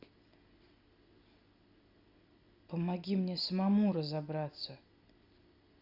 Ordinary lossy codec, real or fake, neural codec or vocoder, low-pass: Opus, 64 kbps; real; none; 5.4 kHz